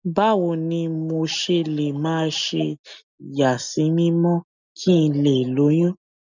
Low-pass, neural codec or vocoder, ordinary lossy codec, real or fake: 7.2 kHz; none; none; real